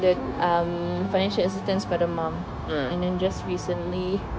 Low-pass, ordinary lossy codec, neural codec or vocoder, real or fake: none; none; codec, 16 kHz, 0.9 kbps, LongCat-Audio-Codec; fake